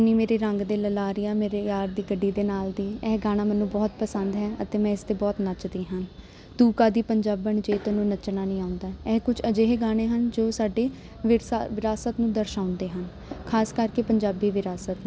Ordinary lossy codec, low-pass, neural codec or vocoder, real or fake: none; none; none; real